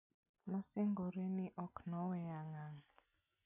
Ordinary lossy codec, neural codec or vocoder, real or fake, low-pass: none; none; real; 3.6 kHz